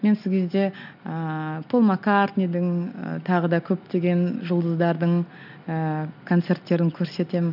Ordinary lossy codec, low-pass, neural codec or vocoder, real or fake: none; 5.4 kHz; none; real